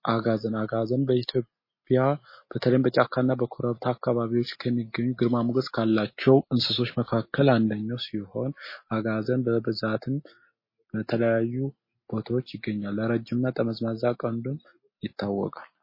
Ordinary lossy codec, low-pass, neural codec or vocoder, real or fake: MP3, 24 kbps; 5.4 kHz; none; real